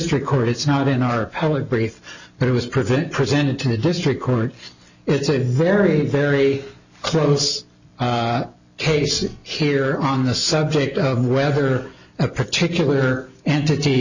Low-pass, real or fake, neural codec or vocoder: 7.2 kHz; real; none